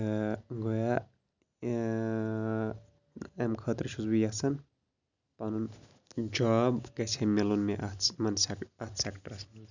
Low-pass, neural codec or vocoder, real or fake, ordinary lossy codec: 7.2 kHz; none; real; none